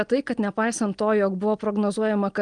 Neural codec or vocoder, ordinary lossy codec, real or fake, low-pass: none; Opus, 24 kbps; real; 9.9 kHz